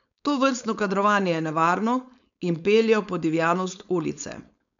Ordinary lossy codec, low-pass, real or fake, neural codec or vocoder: none; 7.2 kHz; fake; codec, 16 kHz, 4.8 kbps, FACodec